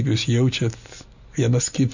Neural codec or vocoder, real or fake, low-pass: none; real; 7.2 kHz